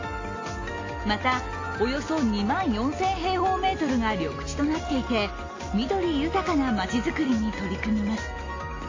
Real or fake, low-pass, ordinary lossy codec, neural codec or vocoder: real; 7.2 kHz; AAC, 32 kbps; none